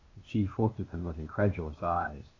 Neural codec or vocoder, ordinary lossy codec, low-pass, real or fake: codec, 16 kHz, 0.8 kbps, ZipCodec; AAC, 32 kbps; 7.2 kHz; fake